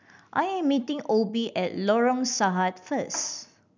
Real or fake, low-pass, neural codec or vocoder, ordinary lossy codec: real; 7.2 kHz; none; none